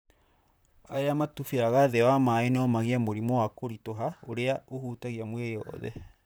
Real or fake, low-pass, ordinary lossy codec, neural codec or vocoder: fake; none; none; vocoder, 44.1 kHz, 128 mel bands, Pupu-Vocoder